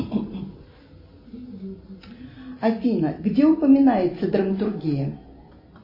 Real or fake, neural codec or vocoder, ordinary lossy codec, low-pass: real; none; MP3, 24 kbps; 5.4 kHz